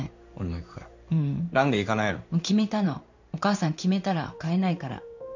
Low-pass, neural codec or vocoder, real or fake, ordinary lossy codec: 7.2 kHz; codec, 16 kHz in and 24 kHz out, 1 kbps, XY-Tokenizer; fake; none